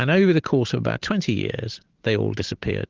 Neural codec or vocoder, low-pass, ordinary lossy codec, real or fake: none; 7.2 kHz; Opus, 16 kbps; real